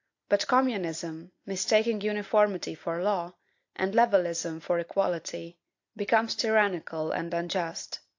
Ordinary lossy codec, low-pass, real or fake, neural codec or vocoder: AAC, 48 kbps; 7.2 kHz; real; none